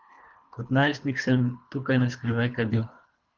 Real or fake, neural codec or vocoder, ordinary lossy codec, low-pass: fake; codec, 24 kHz, 3 kbps, HILCodec; Opus, 24 kbps; 7.2 kHz